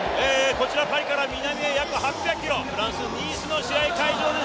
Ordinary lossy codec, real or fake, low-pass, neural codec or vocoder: none; real; none; none